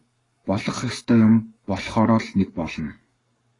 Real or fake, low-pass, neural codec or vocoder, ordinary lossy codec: fake; 10.8 kHz; codec, 44.1 kHz, 7.8 kbps, Pupu-Codec; AAC, 32 kbps